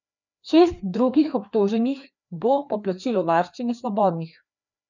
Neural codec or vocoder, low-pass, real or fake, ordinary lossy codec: codec, 16 kHz, 2 kbps, FreqCodec, larger model; 7.2 kHz; fake; none